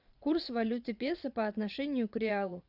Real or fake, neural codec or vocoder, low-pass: fake; vocoder, 22.05 kHz, 80 mel bands, Vocos; 5.4 kHz